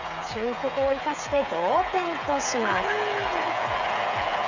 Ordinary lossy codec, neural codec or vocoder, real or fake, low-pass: none; codec, 16 kHz, 8 kbps, FreqCodec, smaller model; fake; 7.2 kHz